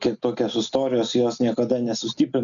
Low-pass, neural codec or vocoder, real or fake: 7.2 kHz; none; real